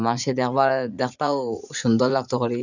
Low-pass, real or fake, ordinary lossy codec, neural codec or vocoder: 7.2 kHz; fake; none; vocoder, 44.1 kHz, 80 mel bands, Vocos